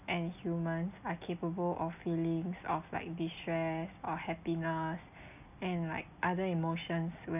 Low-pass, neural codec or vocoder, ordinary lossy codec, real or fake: 3.6 kHz; none; none; real